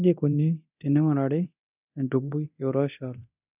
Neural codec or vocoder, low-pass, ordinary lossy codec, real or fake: codec, 24 kHz, 0.9 kbps, DualCodec; 3.6 kHz; none; fake